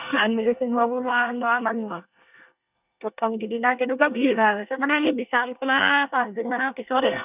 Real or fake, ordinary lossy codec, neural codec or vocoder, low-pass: fake; none; codec, 24 kHz, 1 kbps, SNAC; 3.6 kHz